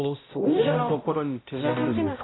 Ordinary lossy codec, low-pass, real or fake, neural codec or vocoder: AAC, 16 kbps; 7.2 kHz; fake; codec, 16 kHz, 0.5 kbps, X-Codec, HuBERT features, trained on balanced general audio